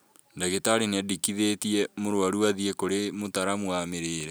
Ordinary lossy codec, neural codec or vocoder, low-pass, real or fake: none; none; none; real